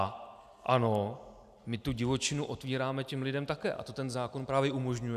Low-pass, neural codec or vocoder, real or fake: 14.4 kHz; none; real